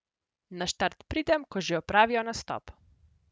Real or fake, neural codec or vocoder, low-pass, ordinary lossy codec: real; none; none; none